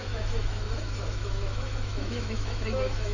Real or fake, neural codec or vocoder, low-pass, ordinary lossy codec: fake; autoencoder, 48 kHz, 128 numbers a frame, DAC-VAE, trained on Japanese speech; 7.2 kHz; none